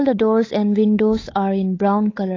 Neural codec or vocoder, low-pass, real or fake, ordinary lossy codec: codec, 16 kHz, 8 kbps, FunCodec, trained on Chinese and English, 25 frames a second; 7.2 kHz; fake; AAC, 32 kbps